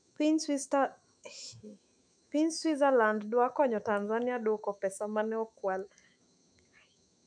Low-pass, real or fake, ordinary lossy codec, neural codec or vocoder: 9.9 kHz; fake; none; autoencoder, 48 kHz, 128 numbers a frame, DAC-VAE, trained on Japanese speech